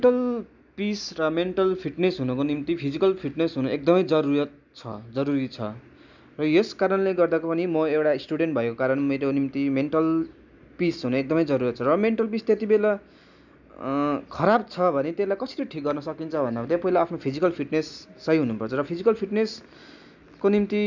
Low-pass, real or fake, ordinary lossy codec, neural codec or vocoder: 7.2 kHz; real; none; none